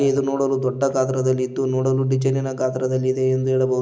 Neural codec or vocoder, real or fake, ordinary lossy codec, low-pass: none; real; none; none